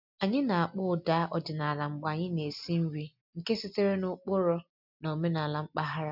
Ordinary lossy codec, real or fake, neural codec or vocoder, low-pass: none; real; none; 5.4 kHz